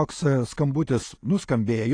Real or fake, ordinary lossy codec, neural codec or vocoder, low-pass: real; AAC, 48 kbps; none; 9.9 kHz